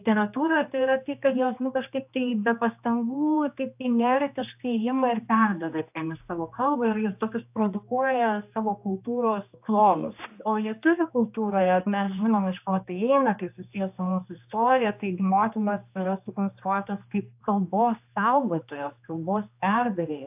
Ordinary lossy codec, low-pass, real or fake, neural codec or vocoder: AAC, 32 kbps; 3.6 kHz; fake; codec, 16 kHz, 2 kbps, X-Codec, HuBERT features, trained on general audio